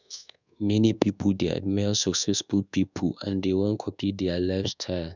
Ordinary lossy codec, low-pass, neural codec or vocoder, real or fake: none; 7.2 kHz; codec, 24 kHz, 1.2 kbps, DualCodec; fake